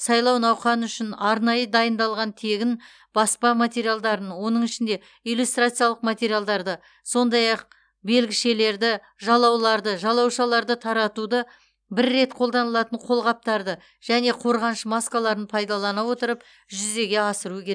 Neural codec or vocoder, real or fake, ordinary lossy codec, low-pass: none; real; none; 9.9 kHz